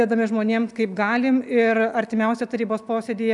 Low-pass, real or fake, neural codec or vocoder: 10.8 kHz; real; none